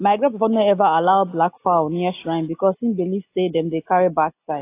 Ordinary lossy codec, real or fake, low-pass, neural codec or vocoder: AAC, 24 kbps; real; 3.6 kHz; none